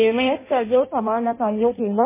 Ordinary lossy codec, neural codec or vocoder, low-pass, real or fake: MP3, 16 kbps; codec, 16 kHz in and 24 kHz out, 0.6 kbps, FireRedTTS-2 codec; 3.6 kHz; fake